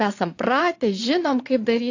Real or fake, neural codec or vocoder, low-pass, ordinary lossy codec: fake; vocoder, 22.05 kHz, 80 mel bands, Vocos; 7.2 kHz; AAC, 48 kbps